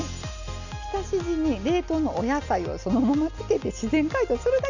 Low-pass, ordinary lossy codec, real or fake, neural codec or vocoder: 7.2 kHz; none; real; none